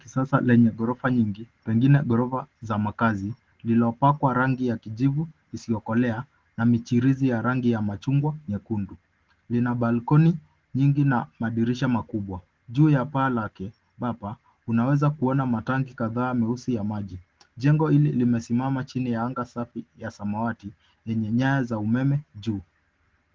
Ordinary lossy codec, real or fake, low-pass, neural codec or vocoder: Opus, 16 kbps; real; 7.2 kHz; none